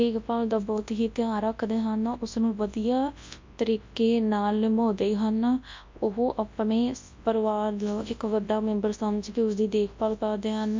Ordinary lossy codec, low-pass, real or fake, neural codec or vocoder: MP3, 48 kbps; 7.2 kHz; fake; codec, 24 kHz, 0.9 kbps, WavTokenizer, large speech release